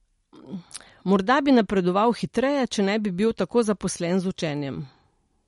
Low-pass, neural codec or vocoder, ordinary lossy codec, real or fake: 19.8 kHz; none; MP3, 48 kbps; real